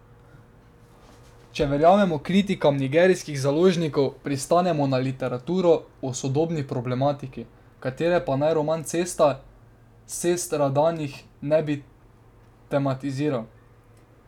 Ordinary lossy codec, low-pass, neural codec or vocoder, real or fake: none; 19.8 kHz; none; real